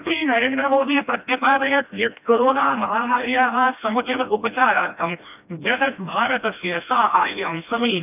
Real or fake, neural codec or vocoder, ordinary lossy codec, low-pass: fake; codec, 16 kHz, 1 kbps, FreqCodec, smaller model; none; 3.6 kHz